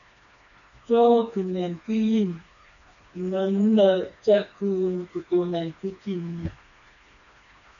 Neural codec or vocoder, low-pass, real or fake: codec, 16 kHz, 2 kbps, FreqCodec, smaller model; 7.2 kHz; fake